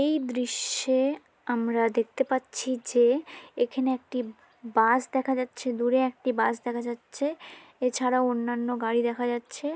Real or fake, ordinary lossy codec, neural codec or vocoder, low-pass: real; none; none; none